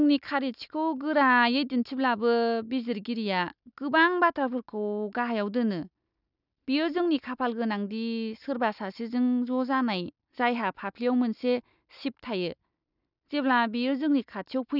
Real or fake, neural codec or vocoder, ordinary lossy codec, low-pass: real; none; none; 5.4 kHz